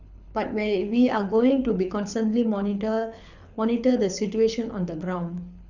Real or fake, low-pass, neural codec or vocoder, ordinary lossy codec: fake; 7.2 kHz; codec, 24 kHz, 6 kbps, HILCodec; none